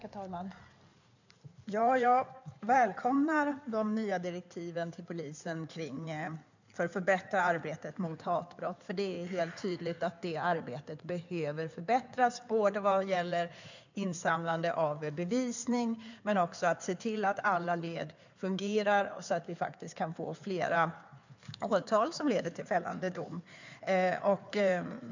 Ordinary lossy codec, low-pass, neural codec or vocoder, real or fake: none; 7.2 kHz; codec, 16 kHz in and 24 kHz out, 2.2 kbps, FireRedTTS-2 codec; fake